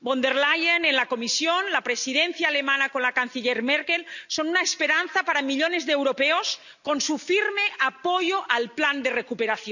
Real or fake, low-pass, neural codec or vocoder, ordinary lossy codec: real; 7.2 kHz; none; none